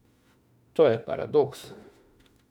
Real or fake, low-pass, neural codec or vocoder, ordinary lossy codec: fake; 19.8 kHz; autoencoder, 48 kHz, 32 numbers a frame, DAC-VAE, trained on Japanese speech; none